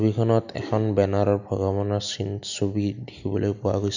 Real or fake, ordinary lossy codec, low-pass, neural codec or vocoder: real; none; 7.2 kHz; none